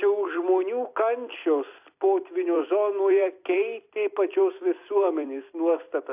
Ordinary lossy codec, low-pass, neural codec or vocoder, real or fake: AAC, 32 kbps; 3.6 kHz; vocoder, 44.1 kHz, 128 mel bands every 256 samples, BigVGAN v2; fake